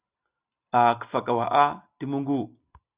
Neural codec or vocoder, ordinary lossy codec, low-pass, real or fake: none; Opus, 64 kbps; 3.6 kHz; real